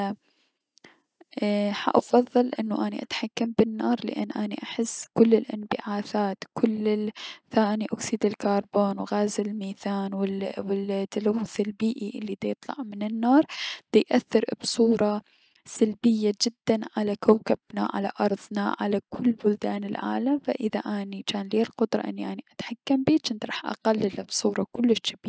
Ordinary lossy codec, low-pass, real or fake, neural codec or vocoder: none; none; real; none